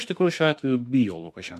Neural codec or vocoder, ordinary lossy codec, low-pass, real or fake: autoencoder, 48 kHz, 32 numbers a frame, DAC-VAE, trained on Japanese speech; MP3, 64 kbps; 14.4 kHz; fake